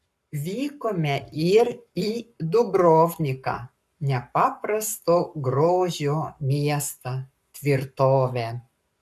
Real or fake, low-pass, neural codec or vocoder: fake; 14.4 kHz; codec, 44.1 kHz, 7.8 kbps, Pupu-Codec